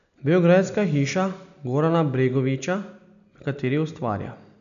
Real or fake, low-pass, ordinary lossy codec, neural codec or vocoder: real; 7.2 kHz; none; none